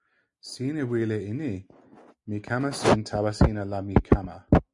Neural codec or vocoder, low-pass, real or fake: none; 10.8 kHz; real